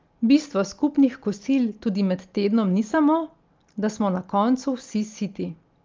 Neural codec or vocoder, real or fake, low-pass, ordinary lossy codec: none; real; 7.2 kHz; Opus, 32 kbps